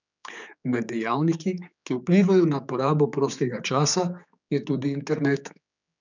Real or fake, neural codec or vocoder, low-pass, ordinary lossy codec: fake; codec, 16 kHz, 4 kbps, X-Codec, HuBERT features, trained on general audio; 7.2 kHz; none